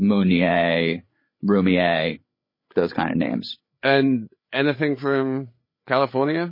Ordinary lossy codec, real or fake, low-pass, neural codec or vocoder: MP3, 24 kbps; fake; 5.4 kHz; codec, 16 kHz, 8 kbps, FreqCodec, larger model